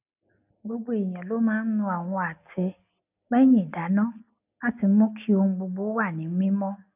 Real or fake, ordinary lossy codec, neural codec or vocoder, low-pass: real; none; none; 3.6 kHz